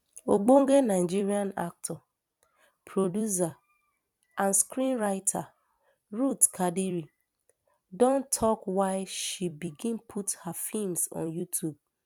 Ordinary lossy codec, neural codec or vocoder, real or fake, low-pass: none; vocoder, 44.1 kHz, 128 mel bands every 256 samples, BigVGAN v2; fake; 19.8 kHz